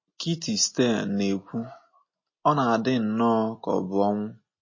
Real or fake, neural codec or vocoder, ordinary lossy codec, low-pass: real; none; MP3, 32 kbps; 7.2 kHz